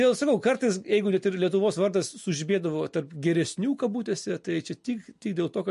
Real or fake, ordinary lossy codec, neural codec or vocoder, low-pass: real; MP3, 48 kbps; none; 14.4 kHz